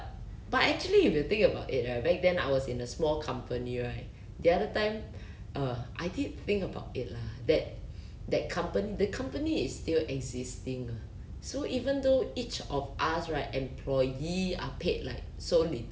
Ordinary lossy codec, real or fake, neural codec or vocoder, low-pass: none; real; none; none